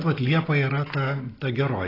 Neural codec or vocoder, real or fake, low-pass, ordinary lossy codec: none; real; 5.4 kHz; AAC, 24 kbps